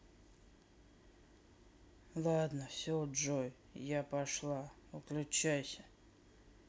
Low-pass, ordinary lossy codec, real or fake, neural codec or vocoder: none; none; real; none